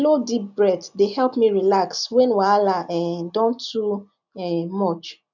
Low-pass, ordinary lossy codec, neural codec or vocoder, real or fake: 7.2 kHz; none; none; real